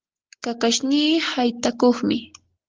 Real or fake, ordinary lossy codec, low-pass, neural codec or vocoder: real; Opus, 16 kbps; 7.2 kHz; none